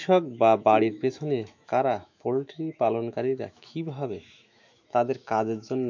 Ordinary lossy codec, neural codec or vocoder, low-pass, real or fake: MP3, 64 kbps; autoencoder, 48 kHz, 128 numbers a frame, DAC-VAE, trained on Japanese speech; 7.2 kHz; fake